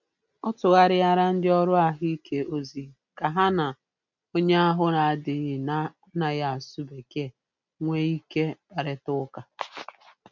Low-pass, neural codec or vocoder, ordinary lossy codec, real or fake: 7.2 kHz; none; none; real